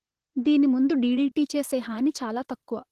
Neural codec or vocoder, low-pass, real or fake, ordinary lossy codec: vocoder, 44.1 kHz, 128 mel bands, Pupu-Vocoder; 14.4 kHz; fake; Opus, 16 kbps